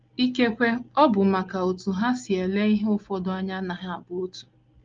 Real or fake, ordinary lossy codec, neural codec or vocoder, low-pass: real; Opus, 32 kbps; none; 7.2 kHz